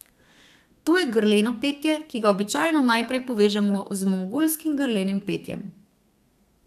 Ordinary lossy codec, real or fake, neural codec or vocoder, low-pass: none; fake; codec, 32 kHz, 1.9 kbps, SNAC; 14.4 kHz